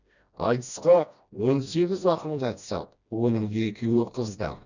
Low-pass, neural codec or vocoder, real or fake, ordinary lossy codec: 7.2 kHz; codec, 16 kHz, 1 kbps, FreqCodec, smaller model; fake; none